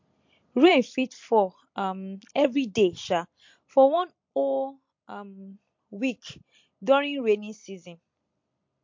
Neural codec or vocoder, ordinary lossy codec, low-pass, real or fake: vocoder, 44.1 kHz, 128 mel bands every 256 samples, BigVGAN v2; MP3, 48 kbps; 7.2 kHz; fake